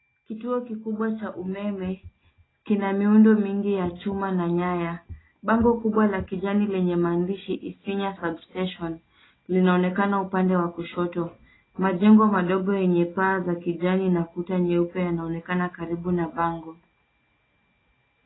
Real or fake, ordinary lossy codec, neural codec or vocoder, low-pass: real; AAC, 16 kbps; none; 7.2 kHz